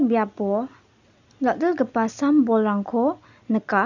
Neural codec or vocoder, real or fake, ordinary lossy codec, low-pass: none; real; none; 7.2 kHz